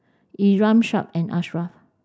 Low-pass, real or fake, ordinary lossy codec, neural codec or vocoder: none; real; none; none